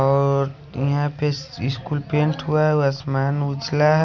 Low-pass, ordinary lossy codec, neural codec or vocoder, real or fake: 7.2 kHz; none; none; real